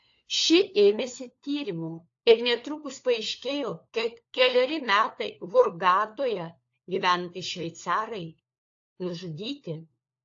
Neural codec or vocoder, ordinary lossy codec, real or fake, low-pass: codec, 16 kHz, 4 kbps, FunCodec, trained on LibriTTS, 50 frames a second; AAC, 48 kbps; fake; 7.2 kHz